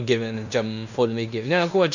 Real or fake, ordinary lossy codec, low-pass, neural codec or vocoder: fake; none; 7.2 kHz; codec, 16 kHz in and 24 kHz out, 0.9 kbps, LongCat-Audio-Codec, fine tuned four codebook decoder